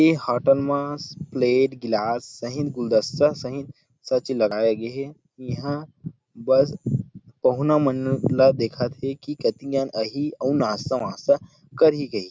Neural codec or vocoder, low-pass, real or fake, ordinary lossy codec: none; none; real; none